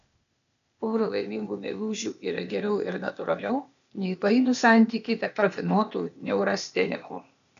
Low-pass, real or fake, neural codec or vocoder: 7.2 kHz; fake; codec, 16 kHz, 0.8 kbps, ZipCodec